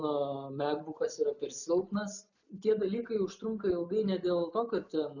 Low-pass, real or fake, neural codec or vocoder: 7.2 kHz; real; none